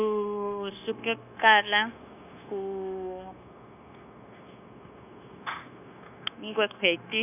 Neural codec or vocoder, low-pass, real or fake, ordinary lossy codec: codec, 16 kHz, 0.9 kbps, LongCat-Audio-Codec; 3.6 kHz; fake; none